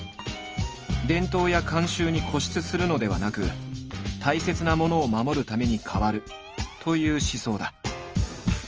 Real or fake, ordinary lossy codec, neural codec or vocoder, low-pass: real; Opus, 24 kbps; none; 7.2 kHz